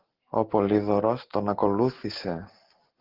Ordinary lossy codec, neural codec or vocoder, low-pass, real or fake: Opus, 16 kbps; none; 5.4 kHz; real